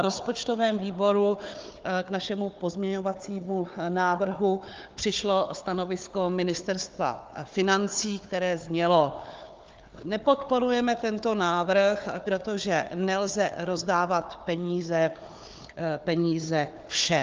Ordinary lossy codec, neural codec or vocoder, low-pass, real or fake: Opus, 32 kbps; codec, 16 kHz, 4 kbps, FunCodec, trained on Chinese and English, 50 frames a second; 7.2 kHz; fake